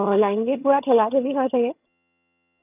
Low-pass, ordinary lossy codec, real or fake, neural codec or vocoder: 3.6 kHz; none; fake; vocoder, 22.05 kHz, 80 mel bands, HiFi-GAN